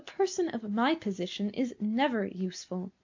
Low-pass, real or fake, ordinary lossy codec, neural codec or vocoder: 7.2 kHz; real; MP3, 64 kbps; none